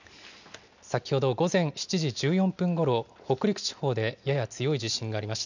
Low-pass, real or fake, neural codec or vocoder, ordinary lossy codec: 7.2 kHz; real; none; none